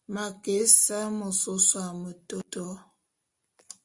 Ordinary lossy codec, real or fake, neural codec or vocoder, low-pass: Opus, 64 kbps; real; none; 10.8 kHz